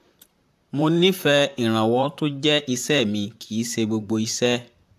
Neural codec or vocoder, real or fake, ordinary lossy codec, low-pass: vocoder, 44.1 kHz, 128 mel bands, Pupu-Vocoder; fake; AAC, 96 kbps; 14.4 kHz